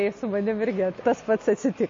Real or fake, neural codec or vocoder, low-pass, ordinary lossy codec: real; none; 7.2 kHz; MP3, 32 kbps